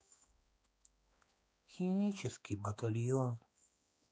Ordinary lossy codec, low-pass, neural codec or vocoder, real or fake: none; none; codec, 16 kHz, 2 kbps, X-Codec, HuBERT features, trained on balanced general audio; fake